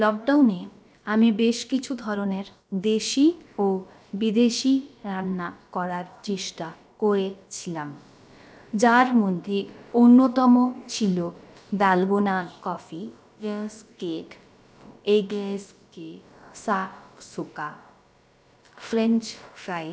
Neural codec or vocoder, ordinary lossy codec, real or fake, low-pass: codec, 16 kHz, about 1 kbps, DyCAST, with the encoder's durations; none; fake; none